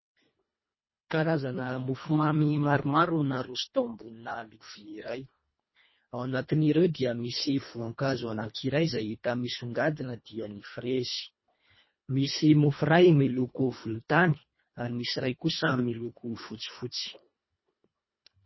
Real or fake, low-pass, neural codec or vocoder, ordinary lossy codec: fake; 7.2 kHz; codec, 24 kHz, 1.5 kbps, HILCodec; MP3, 24 kbps